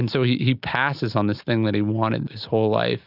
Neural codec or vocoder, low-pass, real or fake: none; 5.4 kHz; real